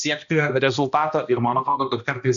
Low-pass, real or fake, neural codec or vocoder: 7.2 kHz; fake; codec, 16 kHz, 1 kbps, X-Codec, HuBERT features, trained on balanced general audio